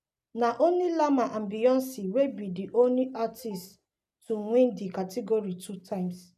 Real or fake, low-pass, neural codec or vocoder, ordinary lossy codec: real; 14.4 kHz; none; none